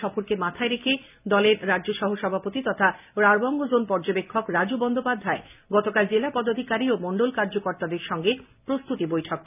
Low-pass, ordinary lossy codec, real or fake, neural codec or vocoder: 3.6 kHz; none; real; none